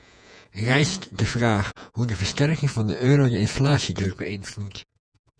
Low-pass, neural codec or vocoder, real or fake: 9.9 kHz; vocoder, 48 kHz, 128 mel bands, Vocos; fake